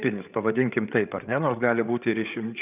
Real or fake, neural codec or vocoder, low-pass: fake; codec, 16 kHz, 8 kbps, FreqCodec, larger model; 3.6 kHz